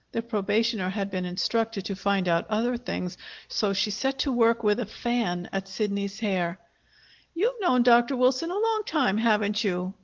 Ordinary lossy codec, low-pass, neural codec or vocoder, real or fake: Opus, 16 kbps; 7.2 kHz; none; real